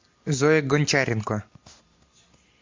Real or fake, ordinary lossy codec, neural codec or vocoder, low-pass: real; MP3, 48 kbps; none; 7.2 kHz